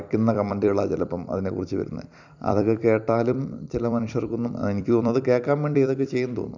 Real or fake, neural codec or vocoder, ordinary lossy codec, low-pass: fake; vocoder, 44.1 kHz, 128 mel bands every 256 samples, BigVGAN v2; none; 7.2 kHz